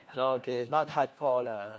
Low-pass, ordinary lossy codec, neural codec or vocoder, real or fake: none; none; codec, 16 kHz, 1 kbps, FunCodec, trained on LibriTTS, 50 frames a second; fake